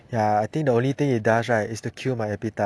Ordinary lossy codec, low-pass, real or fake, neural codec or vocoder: none; none; real; none